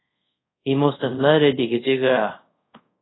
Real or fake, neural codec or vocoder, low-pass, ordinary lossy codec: fake; codec, 24 kHz, 0.5 kbps, DualCodec; 7.2 kHz; AAC, 16 kbps